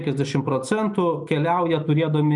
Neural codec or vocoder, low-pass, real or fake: none; 10.8 kHz; real